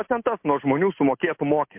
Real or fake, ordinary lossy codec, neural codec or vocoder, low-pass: real; MP3, 32 kbps; none; 3.6 kHz